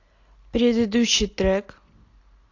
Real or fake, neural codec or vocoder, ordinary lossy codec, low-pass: real; none; AAC, 48 kbps; 7.2 kHz